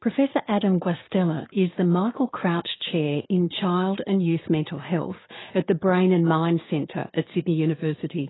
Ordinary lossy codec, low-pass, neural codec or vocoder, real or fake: AAC, 16 kbps; 7.2 kHz; codec, 16 kHz, 6 kbps, DAC; fake